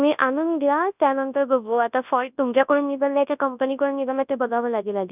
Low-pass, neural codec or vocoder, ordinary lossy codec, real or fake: 3.6 kHz; codec, 24 kHz, 0.9 kbps, WavTokenizer, large speech release; none; fake